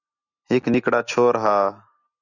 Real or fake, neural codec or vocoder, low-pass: real; none; 7.2 kHz